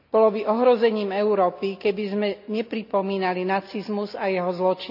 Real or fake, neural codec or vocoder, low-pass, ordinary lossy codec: real; none; 5.4 kHz; none